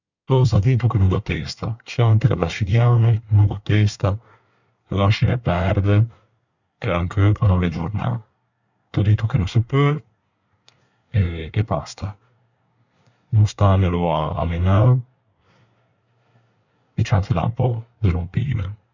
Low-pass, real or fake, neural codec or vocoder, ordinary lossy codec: 7.2 kHz; fake; codec, 24 kHz, 1 kbps, SNAC; none